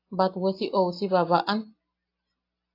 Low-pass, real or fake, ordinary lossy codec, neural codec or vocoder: 5.4 kHz; real; AAC, 32 kbps; none